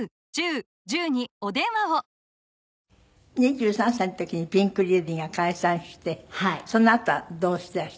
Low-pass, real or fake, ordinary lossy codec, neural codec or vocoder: none; real; none; none